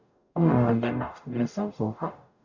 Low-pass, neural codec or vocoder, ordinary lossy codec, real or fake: 7.2 kHz; codec, 44.1 kHz, 0.9 kbps, DAC; none; fake